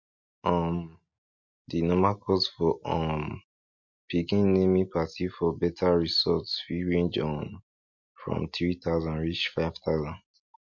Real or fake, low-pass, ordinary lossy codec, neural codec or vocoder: real; 7.2 kHz; MP3, 48 kbps; none